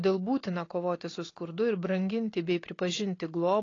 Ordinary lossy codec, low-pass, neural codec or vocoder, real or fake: AAC, 32 kbps; 7.2 kHz; none; real